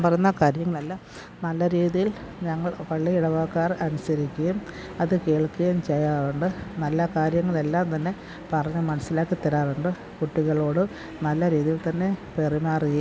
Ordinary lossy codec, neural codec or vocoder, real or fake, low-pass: none; none; real; none